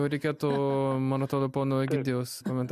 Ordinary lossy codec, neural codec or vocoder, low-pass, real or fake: MP3, 96 kbps; none; 14.4 kHz; real